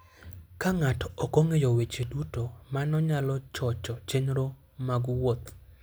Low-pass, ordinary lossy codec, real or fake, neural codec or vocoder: none; none; real; none